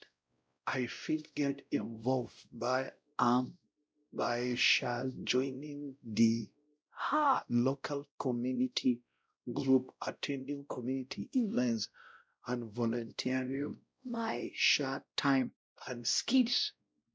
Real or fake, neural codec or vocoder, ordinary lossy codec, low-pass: fake; codec, 16 kHz, 0.5 kbps, X-Codec, WavLM features, trained on Multilingual LibriSpeech; none; none